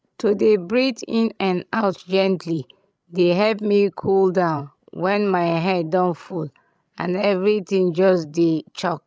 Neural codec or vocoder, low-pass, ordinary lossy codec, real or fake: codec, 16 kHz, 16 kbps, FreqCodec, larger model; none; none; fake